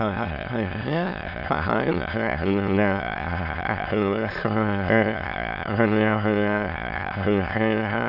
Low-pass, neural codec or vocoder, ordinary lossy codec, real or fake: 5.4 kHz; autoencoder, 22.05 kHz, a latent of 192 numbers a frame, VITS, trained on many speakers; none; fake